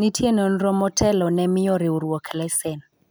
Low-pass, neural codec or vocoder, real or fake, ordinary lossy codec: none; none; real; none